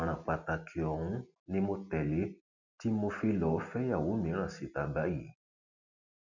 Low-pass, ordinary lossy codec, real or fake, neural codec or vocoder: 7.2 kHz; none; real; none